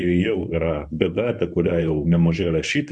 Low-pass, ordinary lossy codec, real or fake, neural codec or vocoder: 10.8 kHz; MP3, 96 kbps; fake; codec, 24 kHz, 0.9 kbps, WavTokenizer, medium speech release version 1